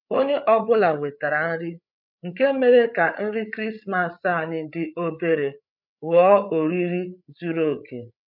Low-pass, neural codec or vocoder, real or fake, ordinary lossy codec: 5.4 kHz; codec, 16 kHz, 8 kbps, FreqCodec, larger model; fake; none